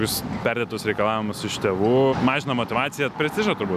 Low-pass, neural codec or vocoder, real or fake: 14.4 kHz; none; real